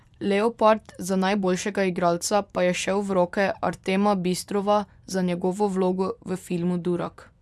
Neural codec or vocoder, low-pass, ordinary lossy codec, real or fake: vocoder, 24 kHz, 100 mel bands, Vocos; none; none; fake